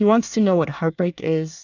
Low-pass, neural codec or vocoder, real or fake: 7.2 kHz; codec, 24 kHz, 1 kbps, SNAC; fake